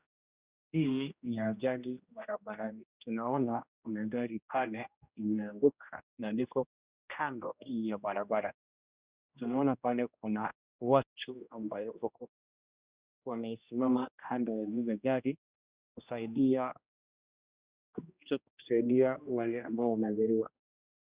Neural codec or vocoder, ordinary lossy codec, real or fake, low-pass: codec, 16 kHz, 1 kbps, X-Codec, HuBERT features, trained on general audio; Opus, 64 kbps; fake; 3.6 kHz